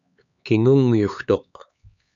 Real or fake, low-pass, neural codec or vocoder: fake; 7.2 kHz; codec, 16 kHz, 4 kbps, X-Codec, HuBERT features, trained on LibriSpeech